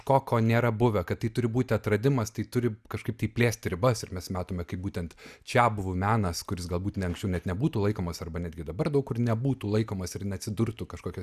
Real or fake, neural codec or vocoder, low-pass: real; none; 14.4 kHz